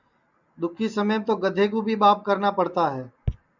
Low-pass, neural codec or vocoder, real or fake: 7.2 kHz; none; real